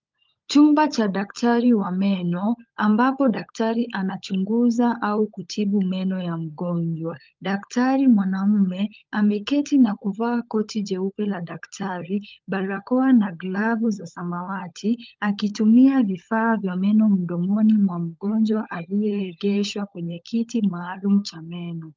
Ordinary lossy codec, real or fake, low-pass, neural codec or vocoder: Opus, 24 kbps; fake; 7.2 kHz; codec, 16 kHz, 16 kbps, FunCodec, trained on LibriTTS, 50 frames a second